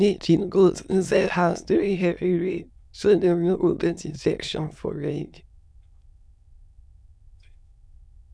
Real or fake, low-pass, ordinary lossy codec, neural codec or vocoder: fake; none; none; autoencoder, 22.05 kHz, a latent of 192 numbers a frame, VITS, trained on many speakers